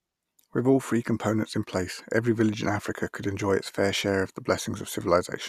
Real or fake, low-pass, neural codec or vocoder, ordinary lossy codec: real; 14.4 kHz; none; Opus, 64 kbps